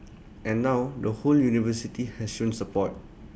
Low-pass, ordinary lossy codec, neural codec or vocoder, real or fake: none; none; none; real